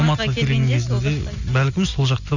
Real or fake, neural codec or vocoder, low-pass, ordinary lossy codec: real; none; 7.2 kHz; none